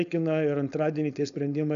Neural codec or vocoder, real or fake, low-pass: codec, 16 kHz, 4.8 kbps, FACodec; fake; 7.2 kHz